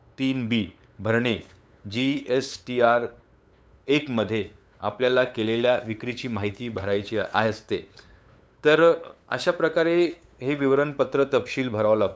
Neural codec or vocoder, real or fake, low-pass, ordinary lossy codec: codec, 16 kHz, 8 kbps, FunCodec, trained on LibriTTS, 25 frames a second; fake; none; none